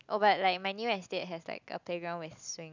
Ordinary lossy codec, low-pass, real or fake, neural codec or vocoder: none; 7.2 kHz; real; none